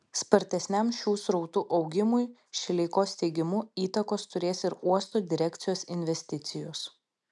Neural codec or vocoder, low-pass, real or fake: none; 10.8 kHz; real